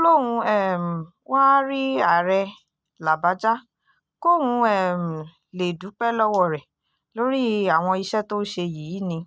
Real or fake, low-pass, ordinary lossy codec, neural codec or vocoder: real; none; none; none